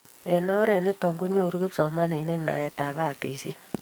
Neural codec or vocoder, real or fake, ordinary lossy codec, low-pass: codec, 44.1 kHz, 2.6 kbps, SNAC; fake; none; none